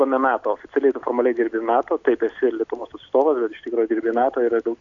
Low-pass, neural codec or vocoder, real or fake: 7.2 kHz; none; real